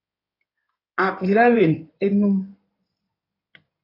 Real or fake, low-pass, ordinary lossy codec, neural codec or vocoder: fake; 5.4 kHz; AAC, 24 kbps; codec, 16 kHz in and 24 kHz out, 2.2 kbps, FireRedTTS-2 codec